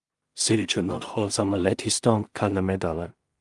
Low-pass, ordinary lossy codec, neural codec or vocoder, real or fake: 10.8 kHz; Opus, 32 kbps; codec, 16 kHz in and 24 kHz out, 0.4 kbps, LongCat-Audio-Codec, two codebook decoder; fake